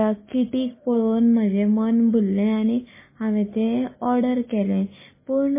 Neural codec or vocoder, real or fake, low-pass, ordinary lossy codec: none; real; 3.6 kHz; MP3, 16 kbps